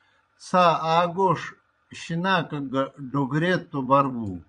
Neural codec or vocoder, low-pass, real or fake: vocoder, 22.05 kHz, 80 mel bands, Vocos; 9.9 kHz; fake